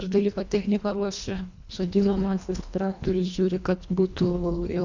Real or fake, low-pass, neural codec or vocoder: fake; 7.2 kHz; codec, 24 kHz, 1.5 kbps, HILCodec